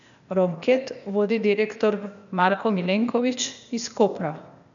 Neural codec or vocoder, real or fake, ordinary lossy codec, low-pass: codec, 16 kHz, 0.8 kbps, ZipCodec; fake; none; 7.2 kHz